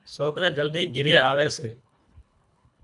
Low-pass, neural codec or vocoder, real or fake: 10.8 kHz; codec, 24 kHz, 1.5 kbps, HILCodec; fake